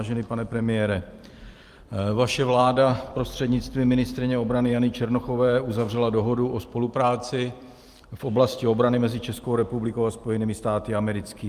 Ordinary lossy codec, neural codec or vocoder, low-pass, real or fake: Opus, 32 kbps; vocoder, 44.1 kHz, 128 mel bands every 256 samples, BigVGAN v2; 14.4 kHz; fake